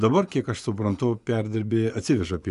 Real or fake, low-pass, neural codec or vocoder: fake; 10.8 kHz; vocoder, 24 kHz, 100 mel bands, Vocos